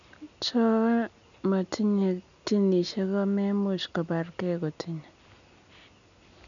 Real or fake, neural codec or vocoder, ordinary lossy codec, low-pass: real; none; none; 7.2 kHz